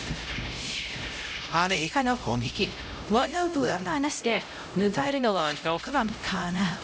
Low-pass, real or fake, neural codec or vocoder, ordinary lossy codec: none; fake; codec, 16 kHz, 0.5 kbps, X-Codec, HuBERT features, trained on LibriSpeech; none